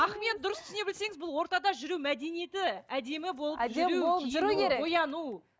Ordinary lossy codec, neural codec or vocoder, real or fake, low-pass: none; none; real; none